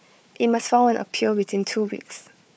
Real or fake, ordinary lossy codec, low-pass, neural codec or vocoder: fake; none; none; codec, 16 kHz, 4 kbps, FunCodec, trained on Chinese and English, 50 frames a second